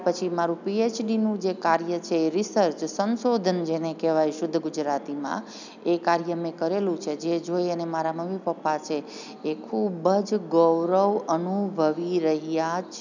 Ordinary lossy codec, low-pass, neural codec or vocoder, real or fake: none; 7.2 kHz; none; real